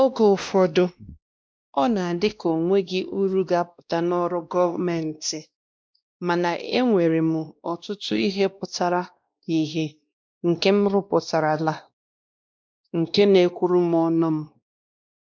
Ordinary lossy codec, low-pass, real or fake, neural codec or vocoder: none; none; fake; codec, 16 kHz, 1 kbps, X-Codec, WavLM features, trained on Multilingual LibriSpeech